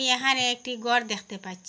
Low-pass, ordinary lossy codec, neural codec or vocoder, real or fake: none; none; none; real